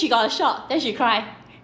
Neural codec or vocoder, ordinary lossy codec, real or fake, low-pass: none; none; real; none